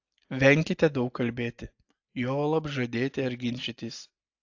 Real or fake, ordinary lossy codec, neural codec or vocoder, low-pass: real; AAC, 48 kbps; none; 7.2 kHz